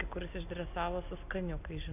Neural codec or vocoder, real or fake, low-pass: none; real; 3.6 kHz